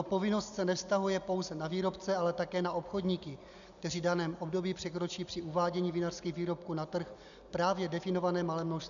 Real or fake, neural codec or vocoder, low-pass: real; none; 7.2 kHz